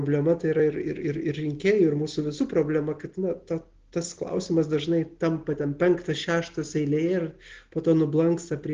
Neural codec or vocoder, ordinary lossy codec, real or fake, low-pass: none; Opus, 16 kbps; real; 7.2 kHz